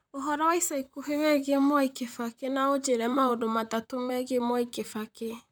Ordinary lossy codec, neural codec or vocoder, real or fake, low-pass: none; vocoder, 44.1 kHz, 128 mel bands, Pupu-Vocoder; fake; none